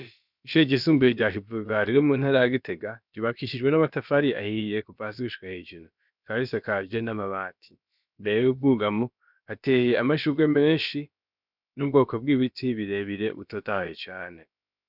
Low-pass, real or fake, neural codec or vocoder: 5.4 kHz; fake; codec, 16 kHz, about 1 kbps, DyCAST, with the encoder's durations